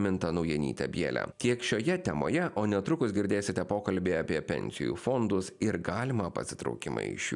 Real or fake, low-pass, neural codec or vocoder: real; 10.8 kHz; none